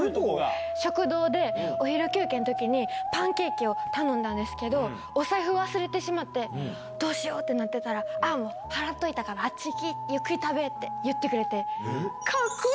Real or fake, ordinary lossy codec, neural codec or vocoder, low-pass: real; none; none; none